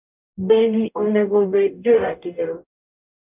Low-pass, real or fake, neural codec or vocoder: 3.6 kHz; fake; codec, 44.1 kHz, 0.9 kbps, DAC